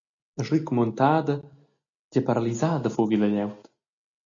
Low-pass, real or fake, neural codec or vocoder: 7.2 kHz; real; none